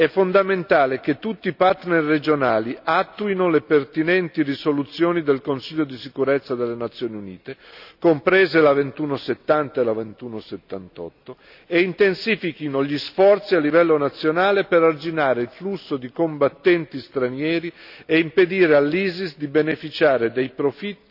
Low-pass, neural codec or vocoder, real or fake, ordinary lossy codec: 5.4 kHz; none; real; none